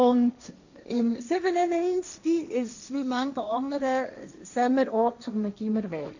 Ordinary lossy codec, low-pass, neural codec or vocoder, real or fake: none; 7.2 kHz; codec, 16 kHz, 1.1 kbps, Voila-Tokenizer; fake